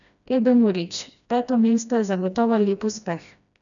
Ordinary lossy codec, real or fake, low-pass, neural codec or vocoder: none; fake; 7.2 kHz; codec, 16 kHz, 1 kbps, FreqCodec, smaller model